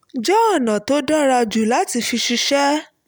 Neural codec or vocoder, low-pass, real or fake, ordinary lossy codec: none; none; real; none